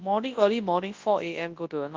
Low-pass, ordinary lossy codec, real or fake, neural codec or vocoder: 7.2 kHz; Opus, 32 kbps; fake; codec, 24 kHz, 0.9 kbps, WavTokenizer, large speech release